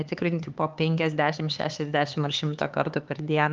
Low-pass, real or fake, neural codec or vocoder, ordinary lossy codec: 7.2 kHz; fake; codec, 16 kHz, 4 kbps, X-Codec, HuBERT features, trained on LibriSpeech; Opus, 32 kbps